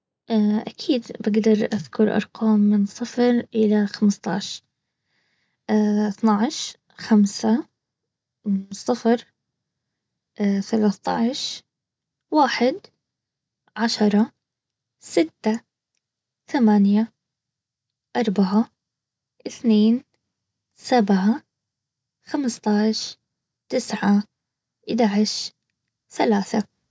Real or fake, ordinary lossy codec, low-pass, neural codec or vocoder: real; none; none; none